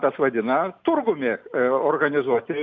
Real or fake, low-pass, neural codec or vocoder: real; 7.2 kHz; none